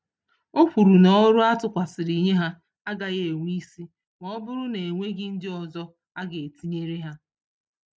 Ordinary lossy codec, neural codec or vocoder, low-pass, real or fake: none; none; none; real